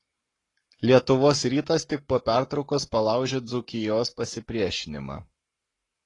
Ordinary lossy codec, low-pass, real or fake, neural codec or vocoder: AAC, 32 kbps; 10.8 kHz; fake; codec, 44.1 kHz, 7.8 kbps, Pupu-Codec